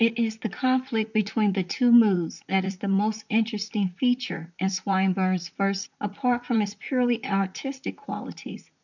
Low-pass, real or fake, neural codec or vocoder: 7.2 kHz; fake; vocoder, 44.1 kHz, 128 mel bands, Pupu-Vocoder